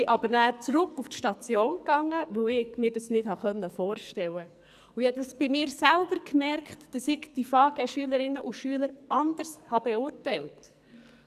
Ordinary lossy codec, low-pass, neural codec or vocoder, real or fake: none; 14.4 kHz; codec, 44.1 kHz, 2.6 kbps, SNAC; fake